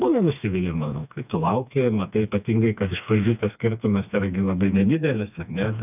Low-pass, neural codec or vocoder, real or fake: 3.6 kHz; codec, 16 kHz, 2 kbps, FreqCodec, smaller model; fake